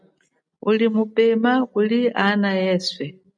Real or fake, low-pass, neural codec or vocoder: real; 9.9 kHz; none